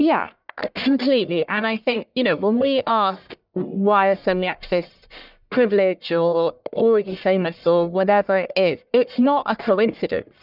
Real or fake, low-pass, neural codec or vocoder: fake; 5.4 kHz; codec, 44.1 kHz, 1.7 kbps, Pupu-Codec